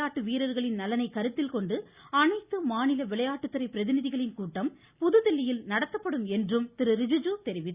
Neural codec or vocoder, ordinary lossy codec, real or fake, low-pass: none; Opus, 64 kbps; real; 3.6 kHz